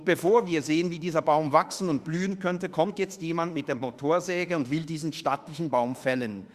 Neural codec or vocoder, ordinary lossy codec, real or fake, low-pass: autoencoder, 48 kHz, 32 numbers a frame, DAC-VAE, trained on Japanese speech; Opus, 64 kbps; fake; 14.4 kHz